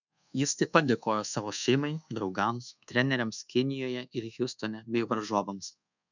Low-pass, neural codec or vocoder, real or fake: 7.2 kHz; codec, 24 kHz, 1.2 kbps, DualCodec; fake